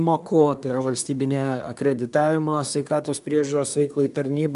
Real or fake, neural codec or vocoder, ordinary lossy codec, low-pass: fake; codec, 24 kHz, 1 kbps, SNAC; AAC, 96 kbps; 10.8 kHz